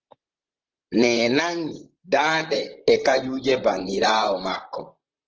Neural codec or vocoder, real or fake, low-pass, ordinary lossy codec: vocoder, 44.1 kHz, 128 mel bands, Pupu-Vocoder; fake; 7.2 kHz; Opus, 16 kbps